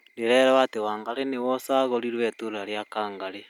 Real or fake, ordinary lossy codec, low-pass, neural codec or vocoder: real; none; 19.8 kHz; none